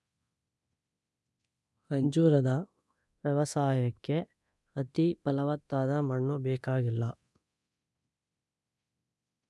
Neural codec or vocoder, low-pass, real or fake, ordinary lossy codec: codec, 24 kHz, 0.9 kbps, DualCodec; none; fake; none